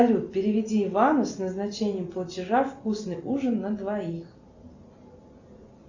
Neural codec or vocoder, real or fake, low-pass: none; real; 7.2 kHz